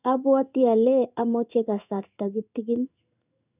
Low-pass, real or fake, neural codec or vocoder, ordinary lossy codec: 3.6 kHz; fake; codec, 16 kHz in and 24 kHz out, 1 kbps, XY-Tokenizer; none